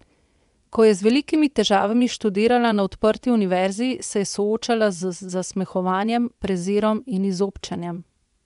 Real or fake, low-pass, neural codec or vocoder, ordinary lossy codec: fake; 10.8 kHz; vocoder, 24 kHz, 100 mel bands, Vocos; none